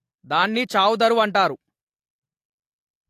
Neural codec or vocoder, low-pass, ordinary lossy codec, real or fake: none; 14.4 kHz; AAC, 64 kbps; real